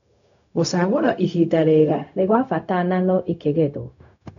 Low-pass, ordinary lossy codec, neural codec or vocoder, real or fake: 7.2 kHz; none; codec, 16 kHz, 0.4 kbps, LongCat-Audio-Codec; fake